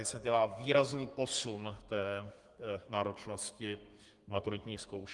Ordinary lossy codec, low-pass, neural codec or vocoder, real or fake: Opus, 24 kbps; 10.8 kHz; codec, 32 kHz, 1.9 kbps, SNAC; fake